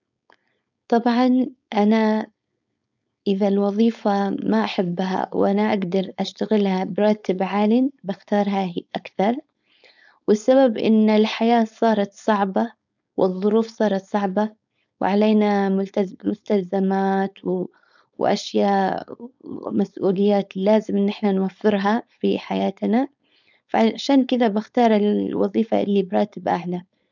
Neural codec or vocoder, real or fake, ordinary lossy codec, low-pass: codec, 16 kHz, 4.8 kbps, FACodec; fake; none; 7.2 kHz